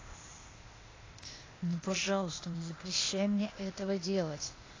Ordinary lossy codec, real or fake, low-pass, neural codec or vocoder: AAC, 32 kbps; fake; 7.2 kHz; codec, 16 kHz, 0.8 kbps, ZipCodec